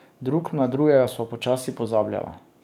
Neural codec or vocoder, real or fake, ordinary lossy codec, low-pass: codec, 44.1 kHz, 7.8 kbps, DAC; fake; none; 19.8 kHz